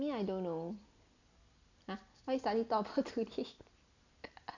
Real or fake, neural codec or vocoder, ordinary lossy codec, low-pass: real; none; none; 7.2 kHz